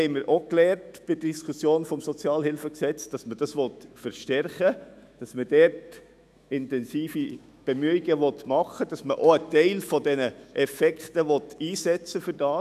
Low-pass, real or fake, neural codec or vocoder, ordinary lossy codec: 14.4 kHz; fake; autoencoder, 48 kHz, 128 numbers a frame, DAC-VAE, trained on Japanese speech; none